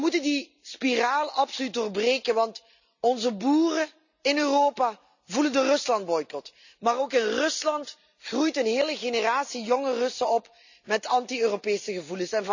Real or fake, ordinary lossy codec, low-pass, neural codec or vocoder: real; none; 7.2 kHz; none